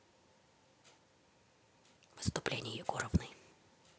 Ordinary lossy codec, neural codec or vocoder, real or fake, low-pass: none; none; real; none